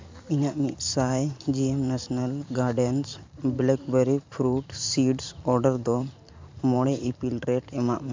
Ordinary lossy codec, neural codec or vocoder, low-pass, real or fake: MP3, 64 kbps; vocoder, 44.1 kHz, 128 mel bands every 512 samples, BigVGAN v2; 7.2 kHz; fake